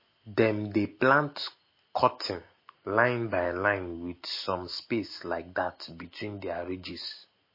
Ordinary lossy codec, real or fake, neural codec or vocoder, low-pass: MP3, 24 kbps; real; none; 5.4 kHz